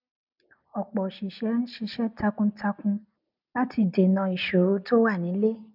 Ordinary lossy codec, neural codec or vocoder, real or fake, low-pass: none; none; real; 5.4 kHz